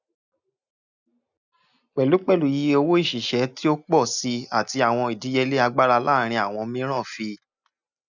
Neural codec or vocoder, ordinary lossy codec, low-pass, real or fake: none; none; 7.2 kHz; real